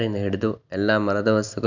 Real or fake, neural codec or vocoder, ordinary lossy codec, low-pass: real; none; none; 7.2 kHz